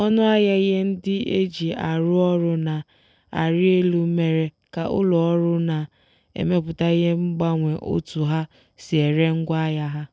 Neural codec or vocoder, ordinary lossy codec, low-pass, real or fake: none; none; none; real